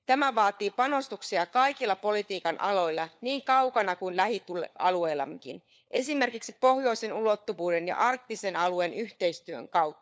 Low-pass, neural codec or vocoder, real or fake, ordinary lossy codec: none; codec, 16 kHz, 4 kbps, FunCodec, trained on LibriTTS, 50 frames a second; fake; none